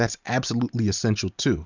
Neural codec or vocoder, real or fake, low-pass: none; real; 7.2 kHz